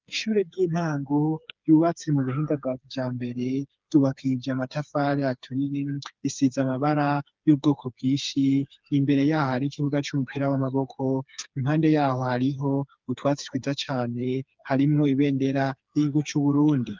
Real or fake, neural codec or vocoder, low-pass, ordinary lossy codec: fake; codec, 16 kHz, 4 kbps, FreqCodec, smaller model; 7.2 kHz; Opus, 24 kbps